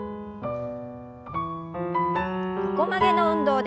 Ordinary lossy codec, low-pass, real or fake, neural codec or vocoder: none; none; real; none